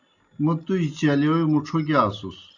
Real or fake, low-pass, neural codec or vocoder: real; 7.2 kHz; none